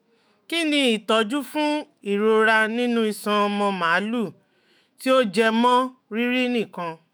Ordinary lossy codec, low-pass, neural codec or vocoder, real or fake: none; none; autoencoder, 48 kHz, 128 numbers a frame, DAC-VAE, trained on Japanese speech; fake